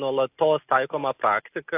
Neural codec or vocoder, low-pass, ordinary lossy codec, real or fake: none; 3.6 kHz; AAC, 24 kbps; real